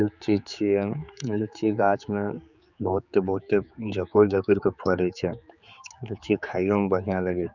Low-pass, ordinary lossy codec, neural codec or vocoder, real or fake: 7.2 kHz; none; codec, 16 kHz, 4 kbps, X-Codec, HuBERT features, trained on general audio; fake